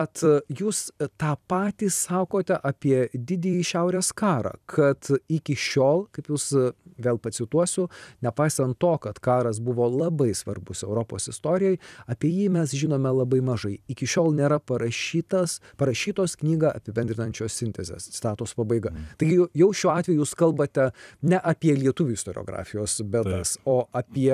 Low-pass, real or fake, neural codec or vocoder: 14.4 kHz; fake; vocoder, 44.1 kHz, 128 mel bands every 256 samples, BigVGAN v2